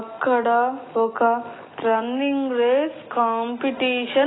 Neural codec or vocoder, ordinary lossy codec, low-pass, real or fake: none; AAC, 16 kbps; 7.2 kHz; real